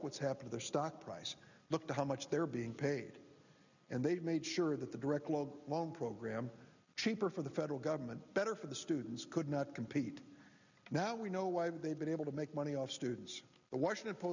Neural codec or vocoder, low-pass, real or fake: none; 7.2 kHz; real